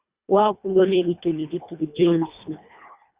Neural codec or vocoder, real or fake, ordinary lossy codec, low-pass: codec, 24 kHz, 1.5 kbps, HILCodec; fake; Opus, 64 kbps; 3.6 kHz